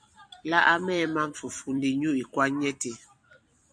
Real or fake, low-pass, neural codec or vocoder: fake; 9.9 kHz; vocoder, 44.1 kHz, 128 mel bands every 256 samples, BigVGAN v2